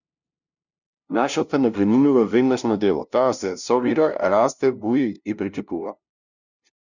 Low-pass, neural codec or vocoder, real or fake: 7.2 kHz; codec, 16 kHz, 0.5 kbps, FunCodec, trained on LibriTTS, 25 frames a second; fake